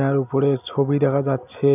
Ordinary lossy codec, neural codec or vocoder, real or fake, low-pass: none; none; real; 3.6 kHz